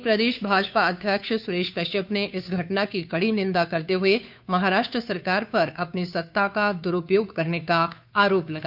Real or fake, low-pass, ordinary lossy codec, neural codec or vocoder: fake; 5.4 kHz; none; codec, 16 kHz, 2 kbps, FunCodec, trained on Chinese and English, 25 frames a second